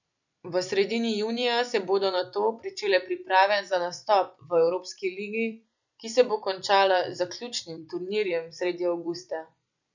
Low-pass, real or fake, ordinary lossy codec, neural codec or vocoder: 7.2 kHz; fake; none; vocoder, 44.1 kHz, 128 mel bands every 256 samples, BigVGAN v2